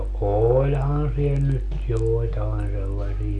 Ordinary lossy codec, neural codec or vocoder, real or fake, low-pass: none; none; real; 10.8 kHz